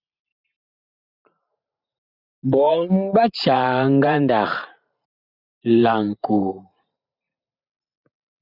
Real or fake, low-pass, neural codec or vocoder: fake; 5.4 kHz; vocoder, 44.1 kHz, 128 mel bands every 512 samples, BigVGAN v2